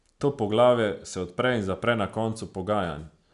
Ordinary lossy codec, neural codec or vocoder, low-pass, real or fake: none; none; 10.8 kHz; real